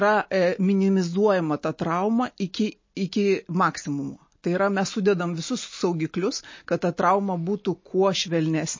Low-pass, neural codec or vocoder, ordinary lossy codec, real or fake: 7.2 kHz; none; MP3, 32 kbps; real